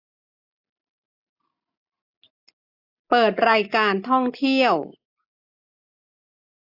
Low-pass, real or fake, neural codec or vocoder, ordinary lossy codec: 5.4 kHz; real; none; AAC, 48 kbps